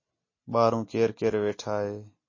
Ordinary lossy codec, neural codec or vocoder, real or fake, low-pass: MP3, 32 kbps; none; real; 7.2 kHz